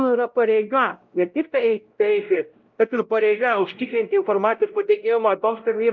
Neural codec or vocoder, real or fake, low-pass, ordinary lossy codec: codec, 16 kHz, 0.5 kbps, X-Codec, WavLM features, trained on Multilingual LibriSpeech; fake; 7.2 kHz; Opus, 32 kbps